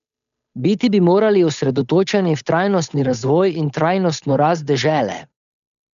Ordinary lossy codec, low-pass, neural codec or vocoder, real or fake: none; 7.2 kHz; codec, 16 kHz, 8 kbps, FunCodec, trained on Chinese and English, 25 frames a second; fake